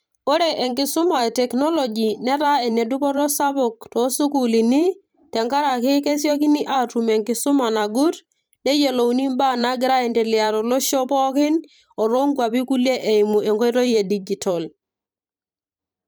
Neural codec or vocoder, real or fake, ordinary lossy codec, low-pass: vocoder, 44.1 kHz, 128 mel bands every 512 samples, BigVGAN v2; fake; none; none